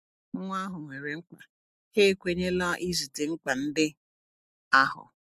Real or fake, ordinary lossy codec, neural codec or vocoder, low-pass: real; MP3, 64 kbps; none; 14.4 kHz